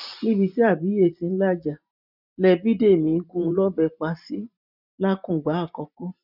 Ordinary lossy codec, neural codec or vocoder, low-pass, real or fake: none; vocoder, 44.1 kHz, 128 mel bands every 512 samples, BigVGAN v2; 5.4 kHz; fake